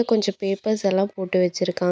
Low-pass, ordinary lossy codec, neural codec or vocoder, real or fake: none; none; none; real